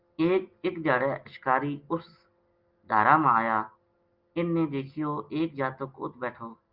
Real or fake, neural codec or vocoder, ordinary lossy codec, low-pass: real; none; Opus, 32 kbps; 5.4 kHz